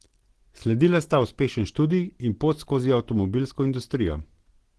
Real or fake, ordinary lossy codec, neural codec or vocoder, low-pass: real; Opus, 16 kbps; none; 10.8 kHz